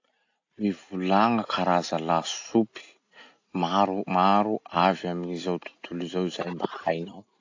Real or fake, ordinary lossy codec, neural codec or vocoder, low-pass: real; AAC, 48 kbps; none; 7.2 kHz